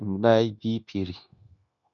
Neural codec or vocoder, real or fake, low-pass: codec, 16 kHz, 0.9 kbps, LongCat-Audio-Codec; fake; 7.2 kHz